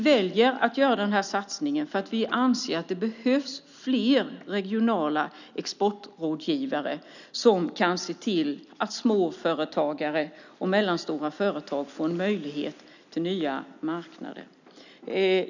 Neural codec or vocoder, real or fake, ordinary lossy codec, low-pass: none; real; none; 7.2 kHz